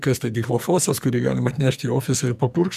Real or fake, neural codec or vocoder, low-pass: fake; codec, 44.1 kHz, 2.6 kbps, SNAC; 14.4 kHz